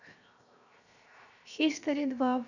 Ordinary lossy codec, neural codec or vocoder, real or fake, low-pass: none; codec, 16 kHz, 0.7 kbps, FocalCodec; fake; 7.2 kHz